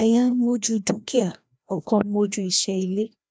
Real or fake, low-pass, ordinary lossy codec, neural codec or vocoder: fake; none; none; codec, 16 kHz, 1 kbps, FreqCodec, larger model